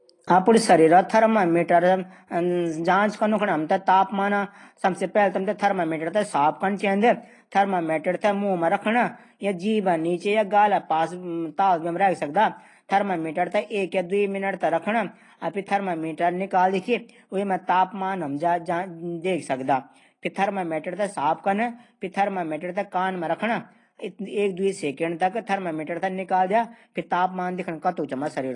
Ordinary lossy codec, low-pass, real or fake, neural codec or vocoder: AAC, 32 kbps; 10.8 kHz; real; none